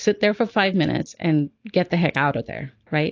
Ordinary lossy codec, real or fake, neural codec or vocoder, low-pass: AAC, 48 kbps; real; none; 7.2 kHz